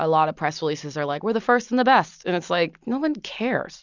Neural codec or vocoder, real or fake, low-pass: none; real; 7.2 kHz